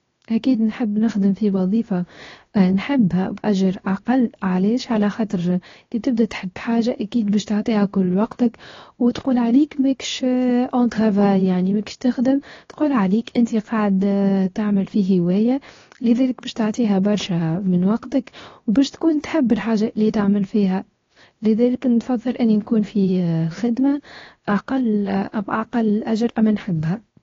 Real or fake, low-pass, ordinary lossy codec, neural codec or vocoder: fake; 7.2 kHz; AAC, 32 kbps; codec, 16 kHz, 0.7 kbps, FocalCodec